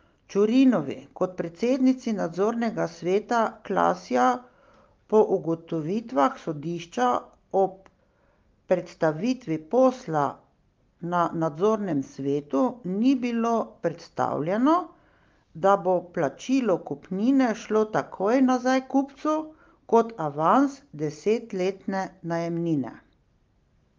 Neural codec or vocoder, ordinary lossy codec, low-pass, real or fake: none; Opus, 32 kbps; 7.2 kHz; real